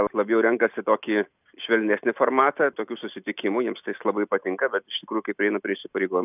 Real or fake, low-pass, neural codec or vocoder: real; 3.6 kHz; none